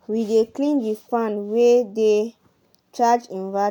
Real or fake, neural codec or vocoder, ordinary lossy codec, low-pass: real; none; none; 19.8 kHz